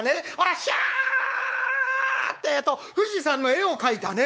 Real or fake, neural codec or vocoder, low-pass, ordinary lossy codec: fake; codec, 16 kHz, 4 kbps, X-Codec, WavLM features, trained on Multilingual LibriSpeech; none; none